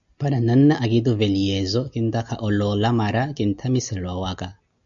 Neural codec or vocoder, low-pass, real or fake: none; 7.2 kHz; real